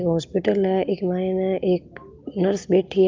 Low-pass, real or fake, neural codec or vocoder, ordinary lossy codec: 7.2 kHz; real; none; Opus, 32 kbps